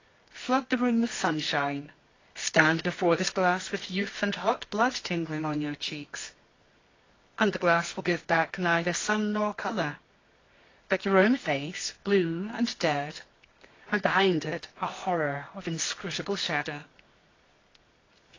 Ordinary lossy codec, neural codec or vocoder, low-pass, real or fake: AAC, 32 kbps; codec, 24 kHz, 0.9 kbps, WavTokenizer, medium music audio release; 7.2 kHz; fake